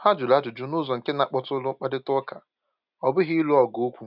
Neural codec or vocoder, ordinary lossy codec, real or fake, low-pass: none; none; real; 5.4 kHz